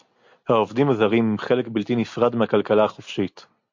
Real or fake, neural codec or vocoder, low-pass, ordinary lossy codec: real; none; 7.2 kHz; MP3, 48 kbps